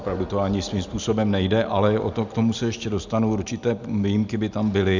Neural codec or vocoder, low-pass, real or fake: none; 7.2 kHz; real